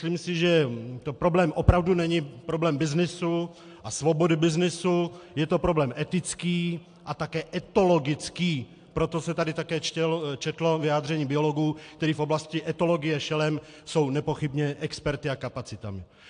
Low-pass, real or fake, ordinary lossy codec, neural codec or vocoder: 9.9 kHz; real; AAC, 64 kbps; none